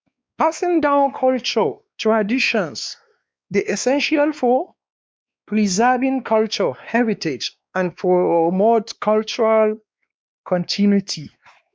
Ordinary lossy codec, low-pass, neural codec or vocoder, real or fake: none; none; codec, 16 kHz, 2 kbps, X-Codec, WavLM features, trained on Multilingual LibriSpeech; fake